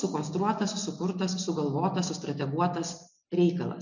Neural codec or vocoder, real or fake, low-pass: none; real; 7.2 kHz